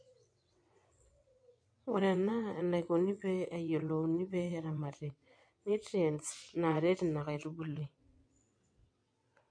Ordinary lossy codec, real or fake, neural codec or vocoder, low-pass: MP3, 48 kbps; fake; vocoder, 22.05 kHz, 80 mel bands, WaveNeXt; 9.9 kHz